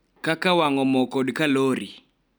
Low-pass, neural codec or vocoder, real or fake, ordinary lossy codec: none; none; real; none